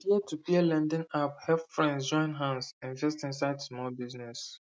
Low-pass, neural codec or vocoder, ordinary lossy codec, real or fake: none; none; none; real